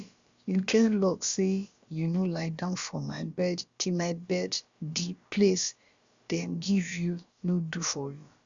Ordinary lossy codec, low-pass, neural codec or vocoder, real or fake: Opus, 64 kbps; 7.2 kHz; codec, 16 kHz, about 1 kbps, DyCAST, with the encoder's durations; fake